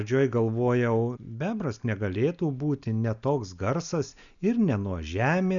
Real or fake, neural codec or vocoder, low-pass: real; none; 7.2 kHz